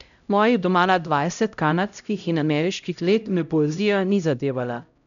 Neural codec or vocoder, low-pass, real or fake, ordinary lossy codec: codec, 16 kHz, 0.5 kbps, X-Codec, HuBERT features, trained on LibriSpeech; 7.2 kHz; fake; none